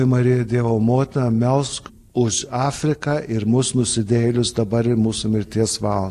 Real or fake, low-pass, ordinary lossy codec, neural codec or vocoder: real; 14.4 kHz; AAC, 64 kbps; none